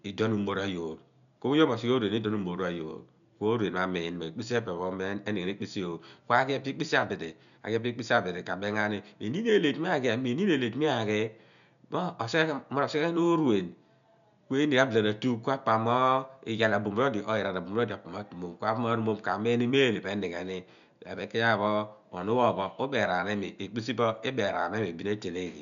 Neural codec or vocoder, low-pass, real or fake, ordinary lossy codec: none; 7.2 kHz; real; none